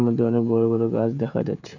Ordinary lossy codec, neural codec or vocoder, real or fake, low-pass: none; codec, 16 kHz, 16 kbps, FreqCodec, smaller model; fake; 7.2 kHz